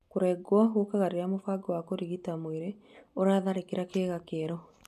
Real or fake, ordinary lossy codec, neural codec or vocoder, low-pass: real; none; none; 14.4 kHz